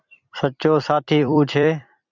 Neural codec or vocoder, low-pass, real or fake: vocoder, 44.1 kHz, 128 mel bands every 256 samples, BigVGAN v2; 7.2 kHz; fake